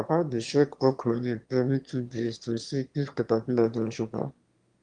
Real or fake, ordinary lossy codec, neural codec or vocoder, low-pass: fake; Opus, 32 kbps; autoencoder, 22.05 kHz, a latent of 192 numbers a frame, VITS, trained on one speaker; 9.9 kHz